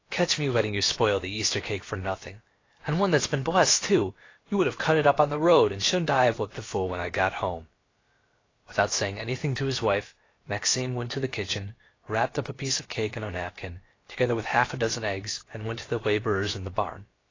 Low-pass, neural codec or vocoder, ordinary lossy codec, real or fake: 7.2 kHz; codec, 16 kHz, about 1 kbps, DyCAST, with the encoder's durations; AAC, 32 kbps; fake